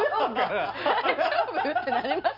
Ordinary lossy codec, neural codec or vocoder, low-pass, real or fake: none; none; 5.4 kHz; real